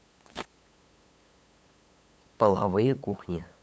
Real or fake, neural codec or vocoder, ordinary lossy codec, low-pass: fake; codec, 16 kHz, 8 kbps, FunCodec, trained on LibriTTS, 25 frames a second; none; none